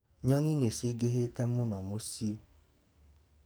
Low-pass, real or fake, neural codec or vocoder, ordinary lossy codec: none; fake; codec, 44.1 kHz, 2.6 kbps, SNAC; none